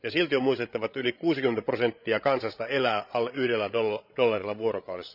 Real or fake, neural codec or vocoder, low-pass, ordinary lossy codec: fake; codec, 16 kHz, 16 kbps, FreqCodec, larger model; 5.4 kHz; none